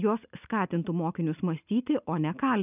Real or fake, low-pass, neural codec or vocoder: real; 3.6 kHz; none